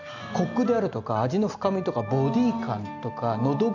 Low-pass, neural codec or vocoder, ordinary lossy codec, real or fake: 7.2 kHz; none; none; real